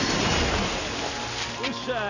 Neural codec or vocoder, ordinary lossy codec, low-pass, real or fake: none; none; 7.2 kHz; real